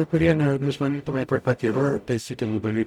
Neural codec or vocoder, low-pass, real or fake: codec, 44.1 kHz, 0.9 kbps, DAC; 19.8 kHz; fake